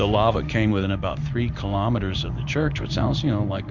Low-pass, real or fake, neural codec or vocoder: 7.2 kHz; fake; codec, 16 kHz in and 24 kHz out, 1 kbps, XY-Tokenizer